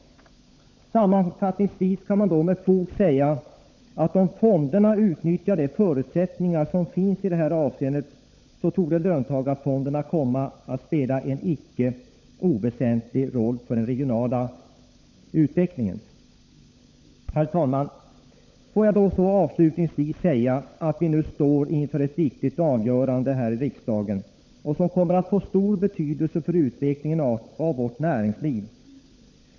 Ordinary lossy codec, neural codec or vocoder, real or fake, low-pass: none; codec, 16 kHz, 16 kbps, FunCodec, trained on LibriTTS, 50 frames a second; fake; none